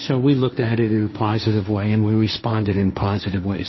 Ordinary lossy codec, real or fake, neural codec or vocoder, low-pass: MP3, 24 kbps; fake; codec, 16 kHz, 1.1 kbps, Voila-Tokenizer; 7.2 kHz